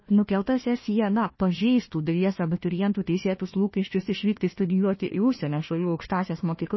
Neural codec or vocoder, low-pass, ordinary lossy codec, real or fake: codec, 16 kHz, 1 kbps, FunCodec, trained on Chinese and English, 50 frames a second; 7.2 kHz; MP3, 24 kbps; fake